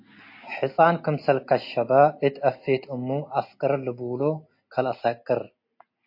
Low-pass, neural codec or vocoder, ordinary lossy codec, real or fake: 5.4 kHz; none; MP3, 32 kbps; real